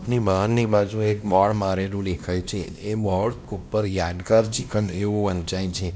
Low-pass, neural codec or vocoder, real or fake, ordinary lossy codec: none; codec, 16 kHz, 1 kbps, X-Codec, WavLM features, trained on Multilingual LibriSpeech; fake; none